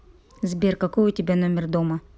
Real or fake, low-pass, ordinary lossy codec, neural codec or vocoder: real; none; none; none